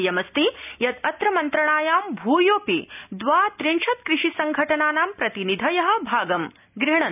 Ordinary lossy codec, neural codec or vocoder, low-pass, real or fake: none; none; 3.6 kHz; real